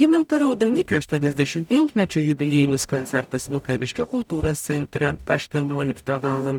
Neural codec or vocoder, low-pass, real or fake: codec, 44.1 kHz, 0.9 kbps, DAC; 19.8 kHz; fake